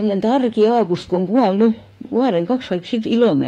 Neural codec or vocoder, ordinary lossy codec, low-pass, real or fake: autoencoder, 48 kHz, 32 numbers a frame, DAC-VAE, trained on Japanese speech; AAC, 48 kbps; 19.8 kHz; fake